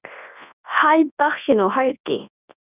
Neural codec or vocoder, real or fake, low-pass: codec, 24 kHz, 0.9 kbps, WavTokenizer, large speech release; fake; 3.6 kHz